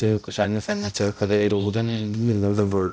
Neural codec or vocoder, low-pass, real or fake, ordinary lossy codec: codec, 16 kHz, 0.5 kbps, X-Codec, HuBERT features, trained on balanced general audio; none; fake; none